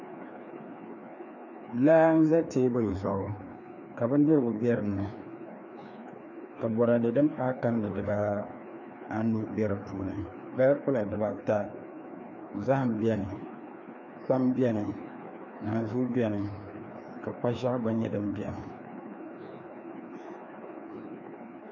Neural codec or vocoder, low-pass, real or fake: codec, 16 kHz, 2 kbps, FreqCodec, larger model; 7.2 kHz; fake